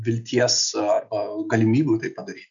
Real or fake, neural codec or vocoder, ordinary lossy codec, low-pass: real; none; MP3, 96 kbps; 7.2 kHz